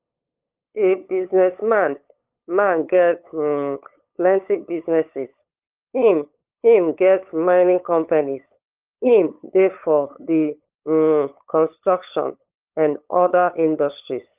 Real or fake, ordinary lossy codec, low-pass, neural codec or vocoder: fake; Opus, 32 kbps; 3.6 kHz; codec, 16 kHz, 8 kbps, FunCodec, trained on LibriTTS, 25 frames a second